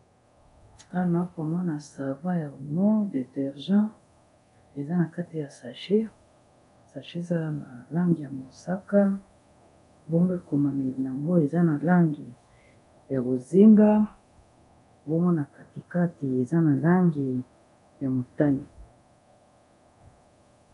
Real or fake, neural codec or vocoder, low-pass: fake; codec, 24 kHz, 0.9 kbps, DualCodec; 10.8 kHz